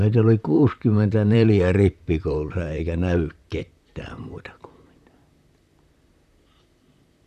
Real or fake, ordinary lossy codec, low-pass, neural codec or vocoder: fake; none; 14.4 kHz; vocoder, 44.1 kHz, 128 mel bands, Pupu-Vocoder